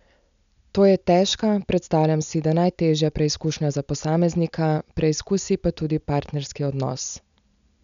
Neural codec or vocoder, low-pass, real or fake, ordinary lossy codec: none; 7.2 kHz; real; none